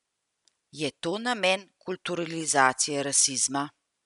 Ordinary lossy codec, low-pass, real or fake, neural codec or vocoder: none; 10.8 kHz; real; none